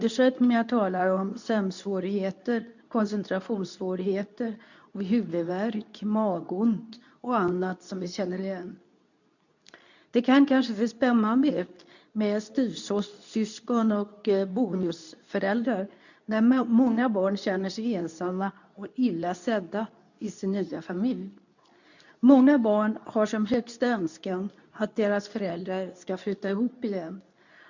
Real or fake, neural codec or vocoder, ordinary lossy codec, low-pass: fake; codec, 24 kHz, 0.9 kbps, WavTokenizer, medium speech release version 2; none; 7.2 kHz